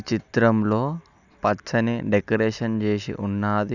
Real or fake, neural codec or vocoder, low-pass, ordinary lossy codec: real; none; 7.2 kHz; none